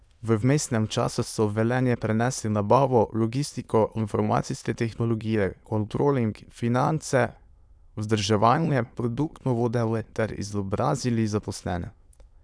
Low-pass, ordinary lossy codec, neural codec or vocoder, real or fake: none; none; autoencoder, 22.05 kHz, a latent of 192 numbers a frame, VITS, trained on many speakers; fake